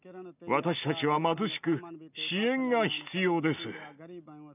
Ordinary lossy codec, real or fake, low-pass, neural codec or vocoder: none; real; 3.6 kHz; none